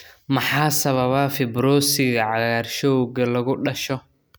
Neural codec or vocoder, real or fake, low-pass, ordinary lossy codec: none; real; none; none